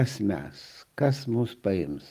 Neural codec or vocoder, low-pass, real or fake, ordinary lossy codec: none; 14.4 kHz; real; Opus, 32 kbps